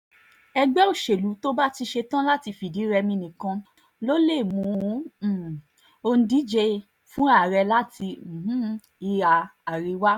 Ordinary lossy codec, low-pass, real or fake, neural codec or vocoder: none; 19.8 kHz; real; none